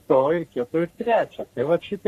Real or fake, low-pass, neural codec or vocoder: fake; 14.4 kHz; codec, 44.1 kHz, 3.4 kbps, Pupu-Codec